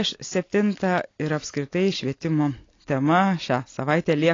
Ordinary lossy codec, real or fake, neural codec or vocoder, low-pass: AAC, 32 kbps; real; none; 7.2 kHz